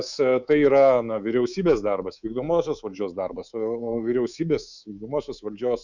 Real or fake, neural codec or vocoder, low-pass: fake; codec, 24 kHz, 3.1 kbps, DualCodec; 7.2 kHz